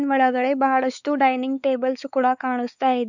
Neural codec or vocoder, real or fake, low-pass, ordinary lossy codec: codec, 16 kHz, 4 kbps, X-Codec, WavLM features, trained on Multilingual LibriSpeech; fake; 7.2 kHz; none